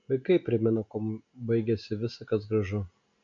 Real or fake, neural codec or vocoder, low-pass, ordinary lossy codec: real; none; 7.2 kHz; MP3, 64 kbps